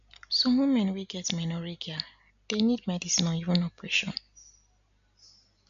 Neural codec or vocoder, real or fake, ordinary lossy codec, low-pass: none; real; none; 7.2 kHz